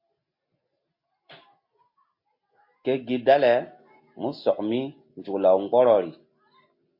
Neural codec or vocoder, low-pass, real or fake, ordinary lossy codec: none; 5.4 kHz; real; MP3, 32 kbps